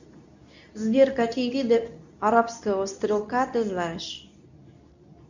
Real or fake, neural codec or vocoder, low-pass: fake; codec, 24 kHz, 0.9 kbps, WavTokenizer, medium speech release version 2; 7.2 kHz